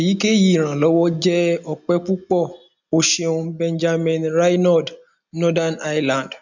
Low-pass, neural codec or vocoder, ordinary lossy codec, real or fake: 7.2 kHz; none; none; real